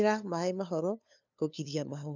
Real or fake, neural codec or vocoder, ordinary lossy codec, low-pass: fake; codec, 16 kHz, 2 kbps, FunCodec, trained on LibriTTS, 25 frames a second; none; 7.2 kHz